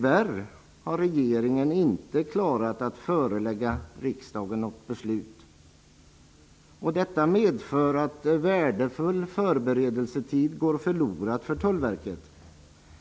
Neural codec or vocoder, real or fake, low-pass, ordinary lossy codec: none; real; none; none